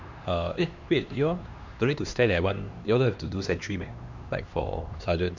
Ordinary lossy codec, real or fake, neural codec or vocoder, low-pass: MP3, 64 kbps; fake; codec, 16 kHz, 2 kbps, X-Codec, HuBERT features, trained on LibriSpeech; 7.2 kHz